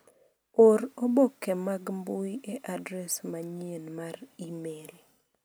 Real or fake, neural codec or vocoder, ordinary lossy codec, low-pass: real; none; none; none